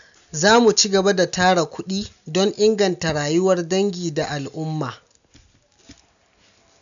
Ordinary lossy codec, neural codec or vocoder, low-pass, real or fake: none; none; 7.2 kHz; real